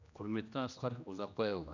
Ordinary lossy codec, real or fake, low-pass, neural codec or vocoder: none; fake; 7.2 kHz; codec, 16 kHz, 1 kbps, X-Codec, HuBERT features, trained on general audio